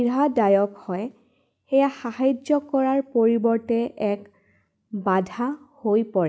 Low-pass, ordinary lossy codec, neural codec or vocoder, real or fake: none; none; none; real